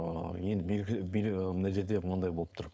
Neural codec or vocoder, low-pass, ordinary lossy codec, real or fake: codec, 16 kHz, 4.8 kbps, FACodec; none; none; fake